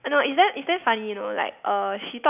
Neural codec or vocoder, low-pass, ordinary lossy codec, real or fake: none; 3.6 kHz; none; real